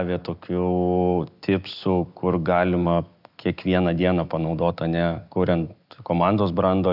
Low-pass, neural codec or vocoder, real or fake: 5.4 kHz; none; real